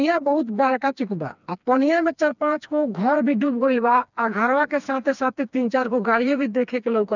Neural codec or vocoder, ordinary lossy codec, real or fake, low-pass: codec, 16 kHz, 2 kbps, FreqCodec, smaller model; none; fake; 7.2 kHz